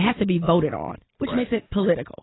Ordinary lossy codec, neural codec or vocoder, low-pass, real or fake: AAC, 16 kbps; none; 7.2 kHz; real